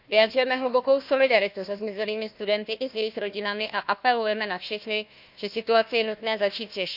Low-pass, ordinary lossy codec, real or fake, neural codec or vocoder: 5.4 kHz; none; fake; codec, 16 kHz, 1 kbps, FunCodec, trained on Chinese and English, 50 frames a second